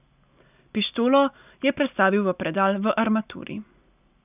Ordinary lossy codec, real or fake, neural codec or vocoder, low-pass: none; real; none; 3.6 kHz